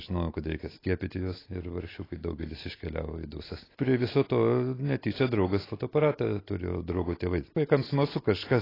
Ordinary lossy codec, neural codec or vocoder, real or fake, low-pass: AAC, 24 kbps; none; real; 5.4 kHz